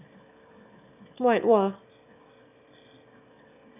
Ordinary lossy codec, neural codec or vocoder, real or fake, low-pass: none; autoencoder, 22.05 kHz, a latent of 192 numbers a frame, VITS, trained on one speaker; fake; 3.6 kHz